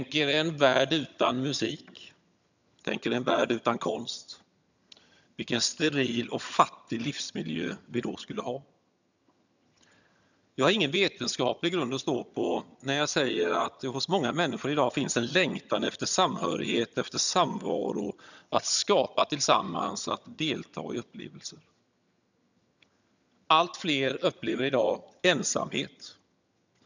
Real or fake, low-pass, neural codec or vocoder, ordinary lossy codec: fake; 7.2 kHz; vocoder, 22.05 kHz, 80 mel bands, HiFi-GAN; none